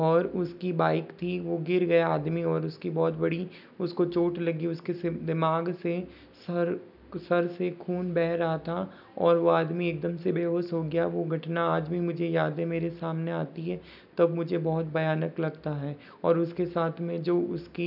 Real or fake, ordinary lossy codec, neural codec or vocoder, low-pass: real; none; none; 5.4 kHz